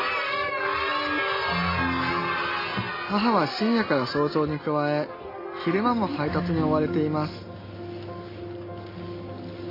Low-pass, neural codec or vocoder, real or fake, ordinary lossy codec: 5.4 kHz; none; real; AAC, 24 kbps